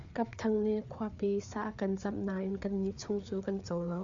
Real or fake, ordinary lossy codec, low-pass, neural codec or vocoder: fake; MP3, 64 kbps; 7.2 kHz; codec, 16 kHz, 4 kbps, FreqCodec, larger model